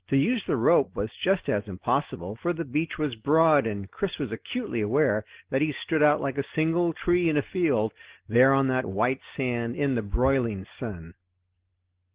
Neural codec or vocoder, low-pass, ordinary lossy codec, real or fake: none; 3.6 kHz; Opus, 16 kbps; real